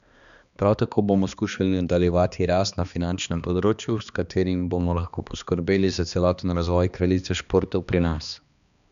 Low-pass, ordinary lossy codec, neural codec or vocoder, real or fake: 7.2 kHz; none; codec, 16 kHz, 2 kbps, X-Codec, HuBERT features, trained on balanced general audio; fake